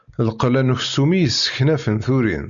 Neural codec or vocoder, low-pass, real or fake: none; 7.2 kHz; real